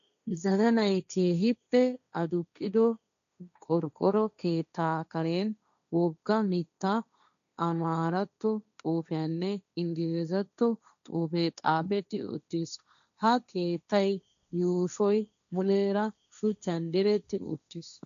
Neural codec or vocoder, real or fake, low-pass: codec, 16 kHz, 1.1 kbps, Voila-Tokenizer; fake; 7.2 kHz